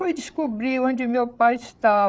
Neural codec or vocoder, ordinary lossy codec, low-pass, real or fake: codec, 16 kHz, 8 kbps, FreqCodec, larger model; none; none; fake